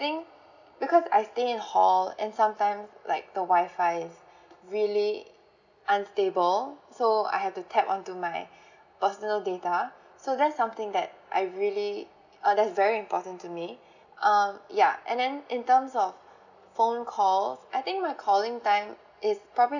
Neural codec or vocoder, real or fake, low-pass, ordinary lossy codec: none; real; 7.2 kHz; none